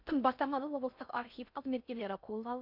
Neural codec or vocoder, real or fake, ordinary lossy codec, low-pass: codec, 16 kHz in and 24 kHz out, 0.6 kbps, FocalCodec, streaming, 4096 codes; fake; none; 5.4 kHz